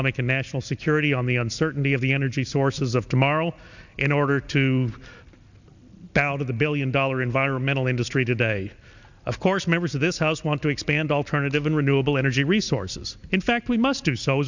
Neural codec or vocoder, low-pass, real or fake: none; 7.2 kHz; real